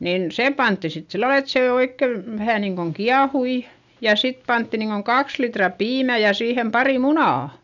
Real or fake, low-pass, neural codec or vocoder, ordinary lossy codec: real; 7.2 kHz; none; none